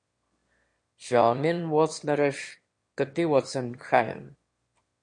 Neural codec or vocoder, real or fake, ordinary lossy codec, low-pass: autoencoder, 22.05 kHz, a latent of 192 numbers a frame, VITS, trained on one speaker; fake; MP3, 48 kbps; 9.9 kHz